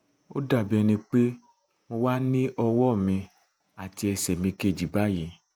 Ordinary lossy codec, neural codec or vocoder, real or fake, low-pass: none; vocoder, 48 kHz, 128 mel bands, Vocos; fake; none